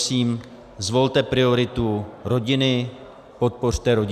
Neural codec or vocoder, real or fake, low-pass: none; real; 14.4 kHz